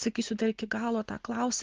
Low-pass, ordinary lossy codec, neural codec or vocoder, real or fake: 7.2 kHz; Opus, 16 kbps; none; real